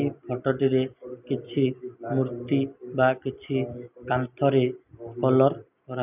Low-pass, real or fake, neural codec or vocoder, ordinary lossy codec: 3.6 kHz; real; none; none